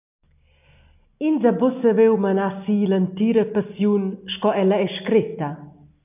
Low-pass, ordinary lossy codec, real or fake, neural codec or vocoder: 3.6 kHz; AAC, 32 kbps; real; none